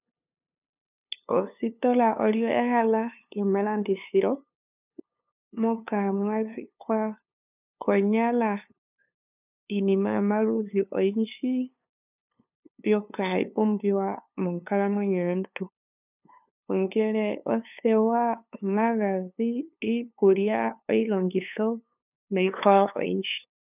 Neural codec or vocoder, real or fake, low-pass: codec, 16 kHz, 2 kbps, FunCodec, trained on LibriTTS, 25 frames a second; fake; 3.6 kHz